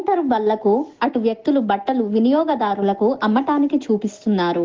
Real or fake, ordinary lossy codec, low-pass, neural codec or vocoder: real; Opus, 16 kbps; 7.2 kHz; none